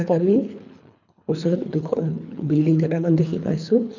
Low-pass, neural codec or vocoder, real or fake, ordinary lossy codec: 7.2 kHz; codec, 24 kHz, 3 kbps, HILCodec; fake; none